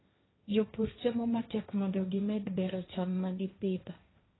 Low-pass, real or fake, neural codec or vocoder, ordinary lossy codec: 7.2 kHz; fake; codec, 16 kHz, 1.1 kbps, Voila-Tokenizer; AAC, 16 kbps